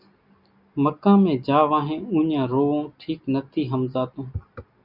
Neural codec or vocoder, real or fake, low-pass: none; real; 5.4 kHz